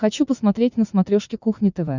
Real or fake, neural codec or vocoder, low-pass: fake; vocoder, 44.1 kHz, 80 mel bands, Vocos; 7.2 kHz